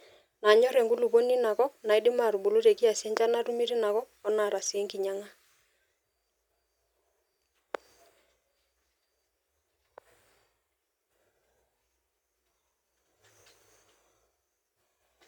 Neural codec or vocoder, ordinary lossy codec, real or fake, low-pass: none; none; real; 19.8 kHz